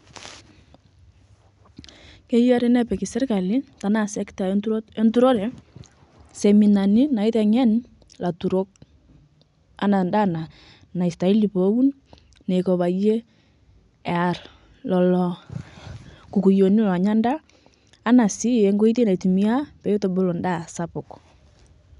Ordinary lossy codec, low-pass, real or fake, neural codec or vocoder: none; 10.8 kHz; real; none